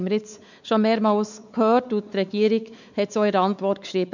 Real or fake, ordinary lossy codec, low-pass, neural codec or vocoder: fake; none; 7.2 kHz; codec, 16 kHz, 4 kbps, X-Codec, WavLM features, trained on Multilingual LibriSpeech